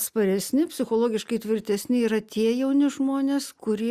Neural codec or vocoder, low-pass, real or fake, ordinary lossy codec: none; 14.4 kHz; real; Opus, 64 kbps